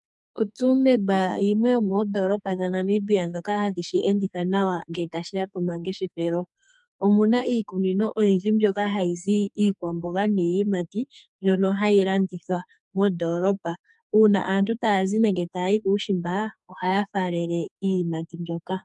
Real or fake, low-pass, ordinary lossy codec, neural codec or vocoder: fake; 10.8 kHz; MP3, 96 kbps; codec, 44.1 kHz, 2.6 kbps, SNAC